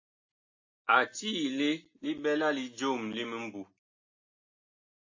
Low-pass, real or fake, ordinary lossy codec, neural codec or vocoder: 7.2 kHz; real; AAC, 48 kbps; none